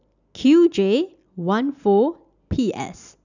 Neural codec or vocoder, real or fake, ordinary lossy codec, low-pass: none; real; none; 7.2 kHz